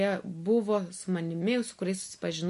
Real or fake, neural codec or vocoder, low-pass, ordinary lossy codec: real; none; 14.4 kHz; MP3, 48 kbps